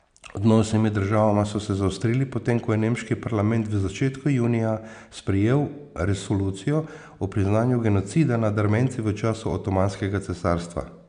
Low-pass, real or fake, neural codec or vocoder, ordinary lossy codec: 9.9 kHz; real; none; MP3, 96 kbps